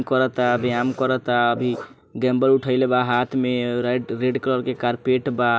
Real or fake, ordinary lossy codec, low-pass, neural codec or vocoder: real; none; none; none